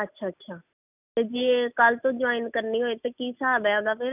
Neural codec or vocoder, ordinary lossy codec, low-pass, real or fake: none; none; 3.6 kHz; real